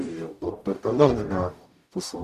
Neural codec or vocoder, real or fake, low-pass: codec, 44.1 kHz, 0.9 kbps, DAC; fake; 14.4 kHz